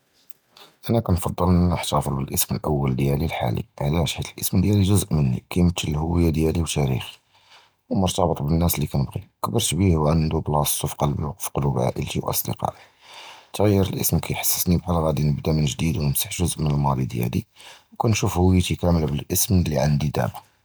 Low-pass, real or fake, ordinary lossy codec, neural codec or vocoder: none; real; none; none